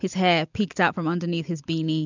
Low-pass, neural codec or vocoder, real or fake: 7.2 kHz; none; real